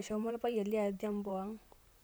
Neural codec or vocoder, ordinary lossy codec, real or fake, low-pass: vocoder, 44.1 kHz, 128 mel bands, Pupu-Vocoder; none; fake; none